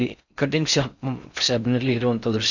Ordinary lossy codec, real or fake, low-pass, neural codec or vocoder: none; fake; 7.2 kHz; codec, 16 kHz in and 24 kHz out, 0.6 kbps, FocalCodec, streaming, 4096 codes